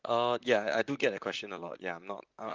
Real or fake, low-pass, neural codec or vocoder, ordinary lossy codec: fake; 7.2 kHz; vocoder, 44.1 kHz, 128 mel bands, Pupu-Vocoder; Opus, 24 kbps